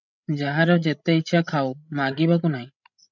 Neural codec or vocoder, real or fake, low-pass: codec, 16 kHz, 16 kbps, FreqCodec, larger model; fake; 7.2 kHz